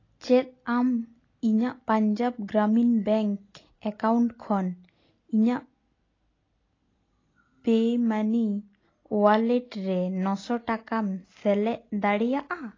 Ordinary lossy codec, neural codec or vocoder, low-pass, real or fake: AAC, 32 kbps; none; 7.2 kHz; real